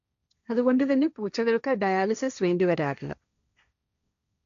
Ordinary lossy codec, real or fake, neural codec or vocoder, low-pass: MP3, 64 kbps; fake; codec, 16 kHz, 1.1 kbps, Voila-Tokenizer; 7.2 kHz